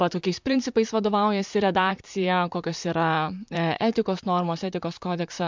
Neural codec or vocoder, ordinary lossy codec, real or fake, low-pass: vocoder, 44.1 kHz, 128 mel bands, Pupu-Vocoder; MP3, 64 kbps; fake; 7.2 kHz